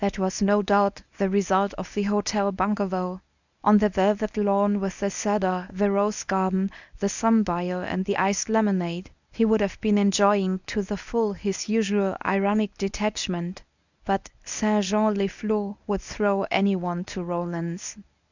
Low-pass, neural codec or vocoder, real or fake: 7.2 kHz; codec, 24 kHz, 0.9 kbps, WavTokenizer, medium speech release version 1; fake